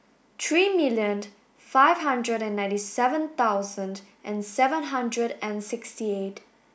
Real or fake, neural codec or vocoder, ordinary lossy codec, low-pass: real; none; none; none